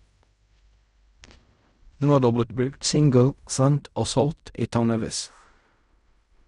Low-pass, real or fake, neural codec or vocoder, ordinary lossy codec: 10.8 kHz; fake; codec, 16 kHz in and 24 kHz out, 0.4 kbps, LongCat-Audio-Codec, fine tuned four codebook decoder; none